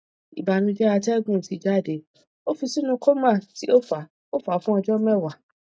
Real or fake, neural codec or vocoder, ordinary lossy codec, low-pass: real; none; none; none